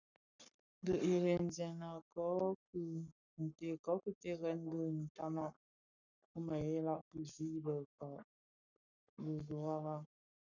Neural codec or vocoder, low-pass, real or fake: codec, 44.1 kHz, 7.8 kbps, Pupu-Codec; 7.2 kHz; fake